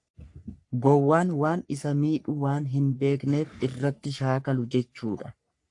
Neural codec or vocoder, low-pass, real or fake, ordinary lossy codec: codec, 44.1 kHz, 3.4 kbps, Pupu-Codec; 10.8 kHz; fake; AAC, 64 kbps